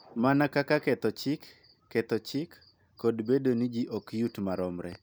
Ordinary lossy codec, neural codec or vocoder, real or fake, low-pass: none; none; real; none